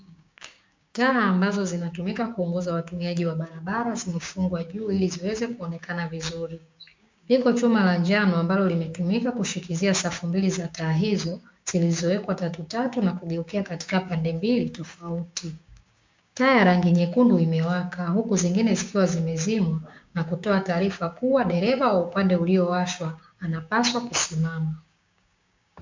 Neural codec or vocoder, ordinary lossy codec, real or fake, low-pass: codec, 16 kHz, 6 kbps, DAC; MP3, 64 kbps; fake; 7.2 kHz